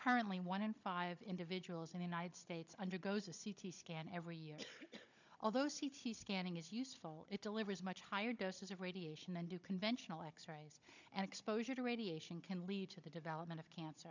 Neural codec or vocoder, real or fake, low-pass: codec, 16 kHz, 16 kbps, FunCodec, trained on Chinese and English, 50 frames a second; fake; 7.2 kHz